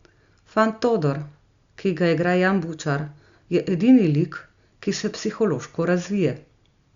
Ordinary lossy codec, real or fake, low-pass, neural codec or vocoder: Opus, 64 kbps; real; 7.2 kHz; none